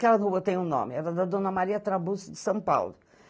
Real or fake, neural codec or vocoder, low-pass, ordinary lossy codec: real; none; none; none